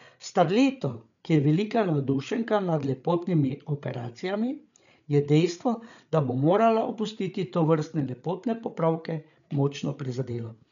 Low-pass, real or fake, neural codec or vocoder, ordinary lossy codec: 7.2 kHz; fake; codec, 16 kHz, 8 kbps, FreqCodec, larger model; none